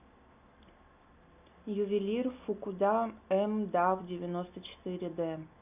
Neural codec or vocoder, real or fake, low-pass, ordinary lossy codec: none; real; 3.6 kHz; none